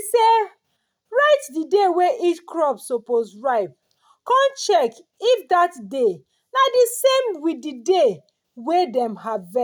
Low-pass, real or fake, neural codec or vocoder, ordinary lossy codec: none; real; none; none